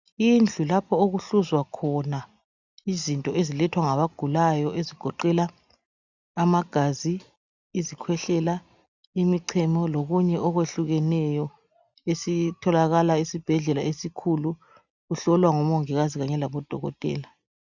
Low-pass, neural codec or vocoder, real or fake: 7.2 kHz; none; real